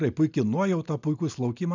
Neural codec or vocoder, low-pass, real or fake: none; 7.2 kHz; real